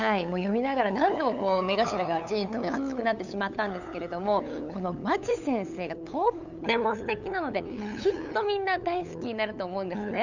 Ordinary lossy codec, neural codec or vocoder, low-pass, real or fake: none; codec, 16 kHz, 8 kbps, FunCodec, trained on LibriTTS, 25 frames a second; 7.2 kHz; fake